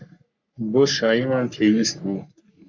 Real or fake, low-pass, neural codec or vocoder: fake; 7.2 kHz; codec, 44.1 kHz, 3.4 kbps, Pupu-Codec